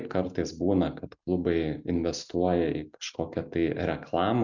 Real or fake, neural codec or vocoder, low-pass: real; none; 7.2 kHz